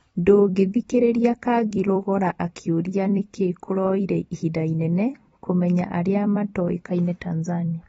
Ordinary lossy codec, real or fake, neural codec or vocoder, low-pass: AAC, 24 kbps; fake; vocoder, 44.1 kHz, 128 mel bands every 256 samples, BigVGAN v2; 19.8 kHz